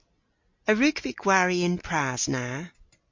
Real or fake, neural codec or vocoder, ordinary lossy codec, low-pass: real; none; MP3, 48 kbps; 7.2 kHz